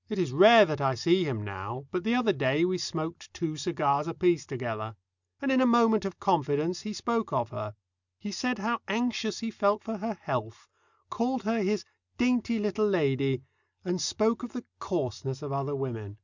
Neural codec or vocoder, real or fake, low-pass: none; real; 7.2 kHz